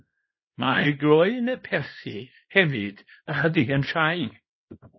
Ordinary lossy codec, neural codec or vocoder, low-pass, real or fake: MP3, 24 kbps; codec, 24 kHz, 0.9 kbps, WavTokenizer, small release; 7.2 kHz; fake